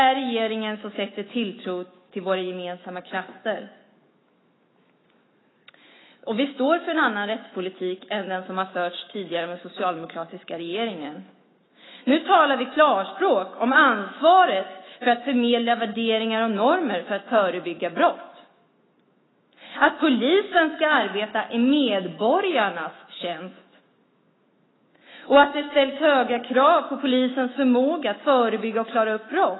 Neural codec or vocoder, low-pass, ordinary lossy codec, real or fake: autoencoder, 48 kHz, 128 numbers a frame, DAC-VAE, trained on Japanese speech; 7.2 kHz; AAC, 16 kbps; fake